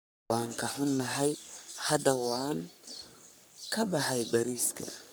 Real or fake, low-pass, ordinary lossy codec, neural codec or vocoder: fake; none; none; codec, 44.1 kHz, 3.4 kbps, Pupu-Codec